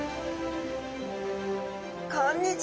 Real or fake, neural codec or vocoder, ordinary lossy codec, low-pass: real; none; none; none